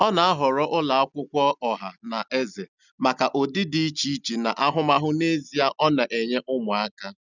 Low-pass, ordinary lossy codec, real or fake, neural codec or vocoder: 7.2 kHz; none; real; none